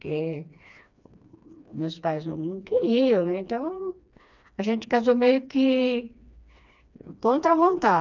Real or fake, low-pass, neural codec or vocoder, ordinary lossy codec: fake; 7.2 kHz; codec, 16 kHz, 2 kbps, FreqCodec, smaller model; none